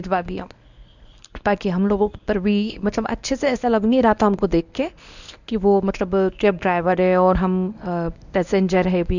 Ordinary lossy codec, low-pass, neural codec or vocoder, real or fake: none; 7.2 kHz; codec, 24 kHz, 0.9 kbps, WavTokenizer, medium speech release version 1; fake